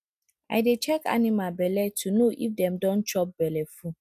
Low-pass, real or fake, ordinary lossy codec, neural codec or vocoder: 14.4 kHz; real; none; none